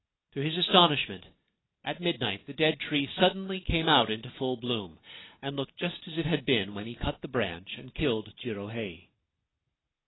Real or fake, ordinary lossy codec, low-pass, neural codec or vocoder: real; AAC, 16 kbps; 7.2 kHz; none